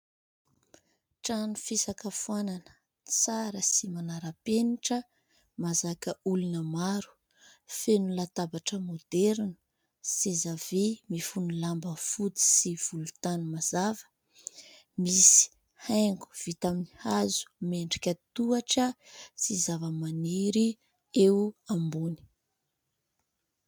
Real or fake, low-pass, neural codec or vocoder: real; 19.8 kHz; none